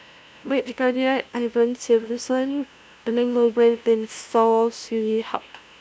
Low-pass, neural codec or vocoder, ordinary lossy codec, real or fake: none; codec, 16 kHz, 0.5 kbps, FunCodec, trained on LibriTTS, 25 frames a second; none; fake